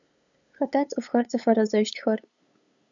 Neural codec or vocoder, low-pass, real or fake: codec, 16 kHz, 8 kbps, FunCodec, trained on LibriTTS, 25 frames a second; 7.2 kHz; fake